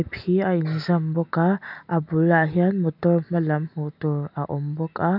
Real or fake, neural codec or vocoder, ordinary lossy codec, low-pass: real; none; none; 5.4 kHz